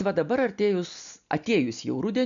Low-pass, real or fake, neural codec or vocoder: 7.2 kHz; real; none